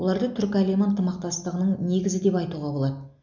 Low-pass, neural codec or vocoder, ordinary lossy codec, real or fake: 7.2 kHz; none; none; real